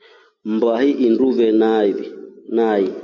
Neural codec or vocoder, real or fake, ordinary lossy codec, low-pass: none; real; AAC, 48 kbps; 7.2 kHz